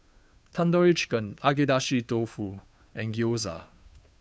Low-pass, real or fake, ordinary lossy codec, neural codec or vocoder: none; fake; none; codec, 16 kHz, 2 kbps, FunCodec, trained on Chinese and English, 25 frames a second